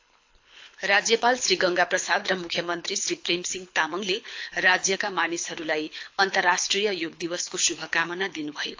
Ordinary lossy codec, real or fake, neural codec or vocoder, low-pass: AAC, 48 kbps; fake; codec, 24 kHz, 6 kbps, HILCodec; 7.2 kHz